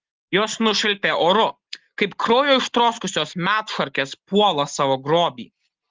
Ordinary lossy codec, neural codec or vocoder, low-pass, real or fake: Opus, 16 kbps; none; 7.2 kHz; real